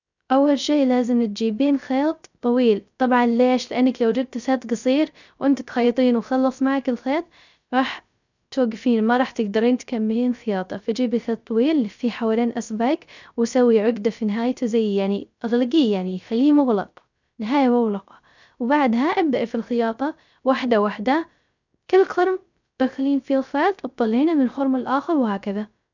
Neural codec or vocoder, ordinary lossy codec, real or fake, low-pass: codec, 16 kHz, 0.3 kbps, FocalCodec; none; fake; 7.2 kHz